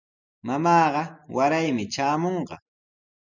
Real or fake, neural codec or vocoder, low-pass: real; none; 7.2 kHz